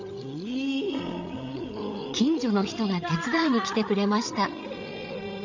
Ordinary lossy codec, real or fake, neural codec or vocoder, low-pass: none; fake; codec, 16 kHz, 8 kbps, FreqCodec, larger model; 7.2 kHz